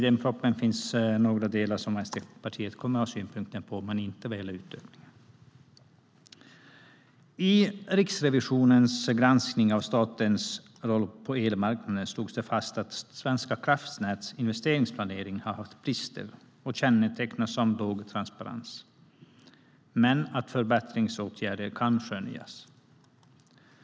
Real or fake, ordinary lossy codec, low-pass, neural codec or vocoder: real; none; none; none